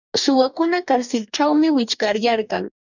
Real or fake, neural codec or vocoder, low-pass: fake; codec, 44.1 kHz, 2.6 kbps, DAC; 7.2 kHz